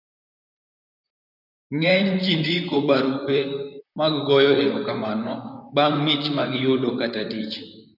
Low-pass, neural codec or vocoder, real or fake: 5.4 kHz; vocoder, 44.1 kHz, 128 mel bands, Pupu-Vocoder; fake